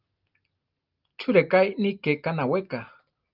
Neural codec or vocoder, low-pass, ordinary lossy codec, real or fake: none; 5.4 kHz; Opus, 24 kbps; real